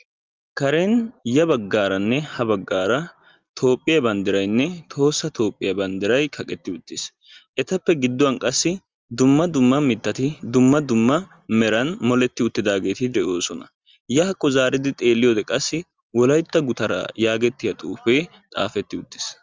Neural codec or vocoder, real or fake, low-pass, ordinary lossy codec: none; real; 7.2 kHz; Opus, 32 kbps